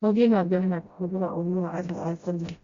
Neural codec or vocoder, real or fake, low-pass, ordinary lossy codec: codec, 16 kHz, 0.5 kbps, FreqCodec, smaller model; fake; 7.2 kHz; Opus, 64 kbps